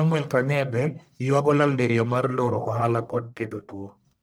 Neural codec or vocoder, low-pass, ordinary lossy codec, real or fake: codec, 44.1 kHz, 1.7 kbps, Pupu-Codec; none; none; fake